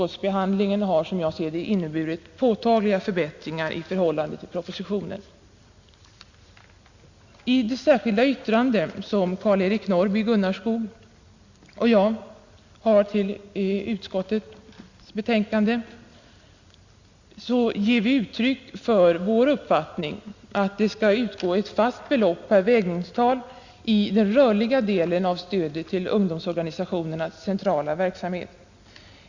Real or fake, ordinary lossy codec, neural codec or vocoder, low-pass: real; Opus, 64 kbps; none; 7.2 kHz